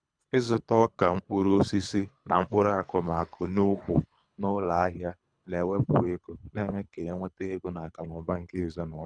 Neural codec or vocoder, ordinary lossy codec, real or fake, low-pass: codec, 24 kHz, 3 kbps, HILCodec; none; fake; 9.9 kHz